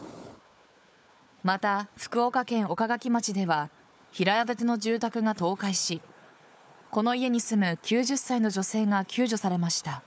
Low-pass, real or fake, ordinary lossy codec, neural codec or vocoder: none; fake; none; codec, 16 kHz, 4 kbps, FunCodec, trained on Chinese and English, 50 frames a second